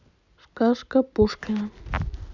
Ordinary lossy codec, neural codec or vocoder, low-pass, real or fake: none; none; 7.2 kHz; real